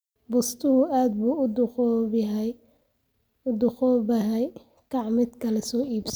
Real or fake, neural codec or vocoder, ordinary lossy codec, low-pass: real; none; none; none